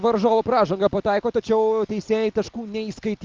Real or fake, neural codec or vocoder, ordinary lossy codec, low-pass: real; none; Opus, 24 kbps; 7.2 kHz